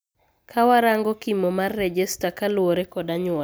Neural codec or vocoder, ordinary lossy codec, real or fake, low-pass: none; none; real; none